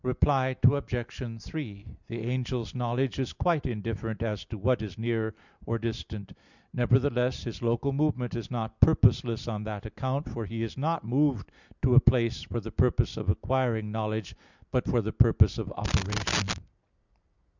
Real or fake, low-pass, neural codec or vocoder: real; 7.2 kHz; none